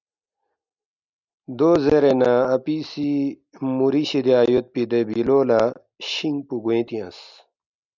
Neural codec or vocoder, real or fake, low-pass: none; real; 7.2 kHz